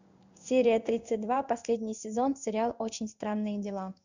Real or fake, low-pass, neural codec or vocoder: fake; 7.2 kHz; codec, 16 kHz in and 24 kHz out, 1 kbps, XY-Tokenizer